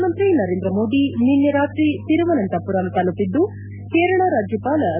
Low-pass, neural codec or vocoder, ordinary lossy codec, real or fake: 3.6 kHz; none; none; real